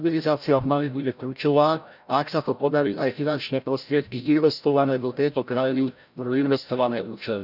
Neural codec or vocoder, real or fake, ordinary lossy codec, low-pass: codec, 16 kHz, 0.5 kbps, FreqCodec, larger model; fake; none; 5.4 kHz